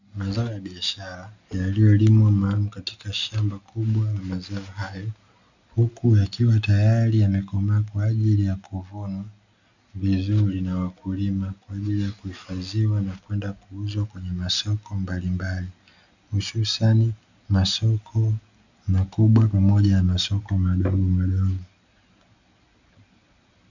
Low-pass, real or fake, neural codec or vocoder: 7.2 kHz; real; none